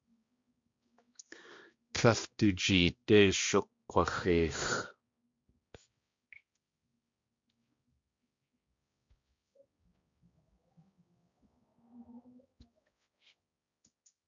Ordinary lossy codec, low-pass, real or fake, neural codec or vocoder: MP3, 48 kbps; 7.2 kHz; fake; codec, 16 kHz, 1 kbps, X-Codec, HuBERT features, trained on balanced general audio